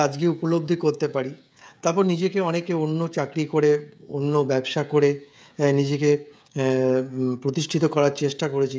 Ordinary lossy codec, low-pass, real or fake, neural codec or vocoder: none; none; fake; codec, 16 kHz, 16 kbps, FreqCodec, smaller model